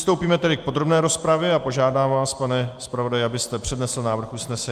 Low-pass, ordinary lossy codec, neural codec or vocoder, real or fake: 14.4 kHz; Opus, 32 kbps; none; real